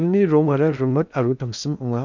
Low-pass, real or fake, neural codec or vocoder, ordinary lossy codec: 7.2 kHz; fake; codec, 16 kHz in and 24 kHz out, 0.8 kbps, FocalCodec, streaming, 65536 codes; MP3, 64 kbps